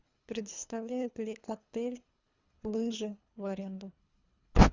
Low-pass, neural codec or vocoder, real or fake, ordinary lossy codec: 7.2 kHz; codec, 24 kHz, 3 kbps, HILCodec; fake; Opus, 64 kbps